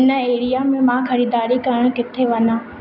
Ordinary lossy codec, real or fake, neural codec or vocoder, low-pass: none; real; none; 5.4 kHz